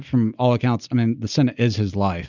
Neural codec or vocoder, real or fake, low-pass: none; real; 7.2 kHz